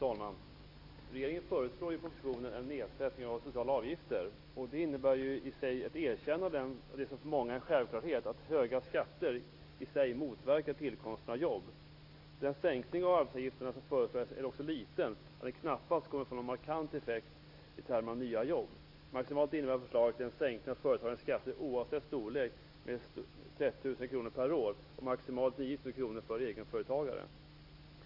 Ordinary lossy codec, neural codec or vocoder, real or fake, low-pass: AAC, 32 kbps; none; real; 5.4 kHz